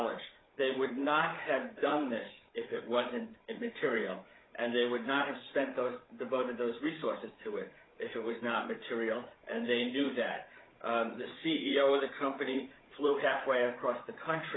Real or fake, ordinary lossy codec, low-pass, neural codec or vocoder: fake; AAC, 16 kbps; 7.2 kHz; codec, 16 kHz, 8 kbps, FreqCodec, larger model